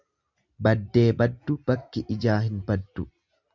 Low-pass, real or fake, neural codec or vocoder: 7.2 kHz; real; none